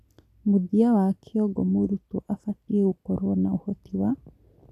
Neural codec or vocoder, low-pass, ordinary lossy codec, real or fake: none; 14.4 kHz; AAC, 96 kbps; real